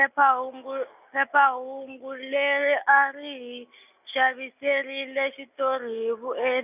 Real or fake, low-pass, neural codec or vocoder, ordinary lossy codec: real; 3.6 kHz; none; none